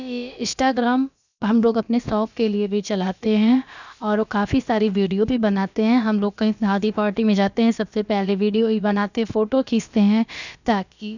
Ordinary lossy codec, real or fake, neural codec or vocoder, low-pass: none; fake; codec, 16 kHz, about 1 kbps, DyCAST, with the encoder's durations; 7.2 kHz